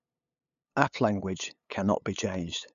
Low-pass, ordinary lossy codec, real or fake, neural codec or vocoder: 7.2 kHz; none; fake; codec, 16 kHz, 8 kbps, FunCodec, trained on LibriTTS, 25 frames a second